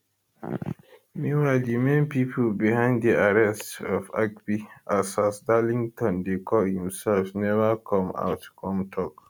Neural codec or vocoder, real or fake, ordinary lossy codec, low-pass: none; real; none; none